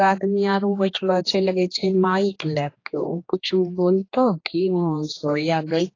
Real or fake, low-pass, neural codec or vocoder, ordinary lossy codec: fake; 7.2 kHz; codec, 16 kHz, 2 kbps, X-Codec, HuBERT features, trained on general audio; AAC, 32 kbps